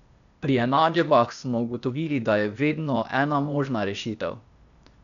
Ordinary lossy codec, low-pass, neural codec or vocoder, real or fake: none; 7.2 kHz; codec, 16 kHz, 0.8 kbps, ZipCodec; fake